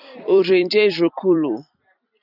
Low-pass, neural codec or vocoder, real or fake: 5.4 kHz; vocoder, 44.1 kHz, 128 mel bands every 256 samples, BigVGAN v2; fake